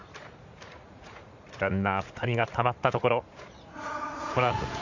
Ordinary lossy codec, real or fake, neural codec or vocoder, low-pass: none; fake; vocoder, 44.1 kHz, 80 mel bands, Vocos; 7.2 kHz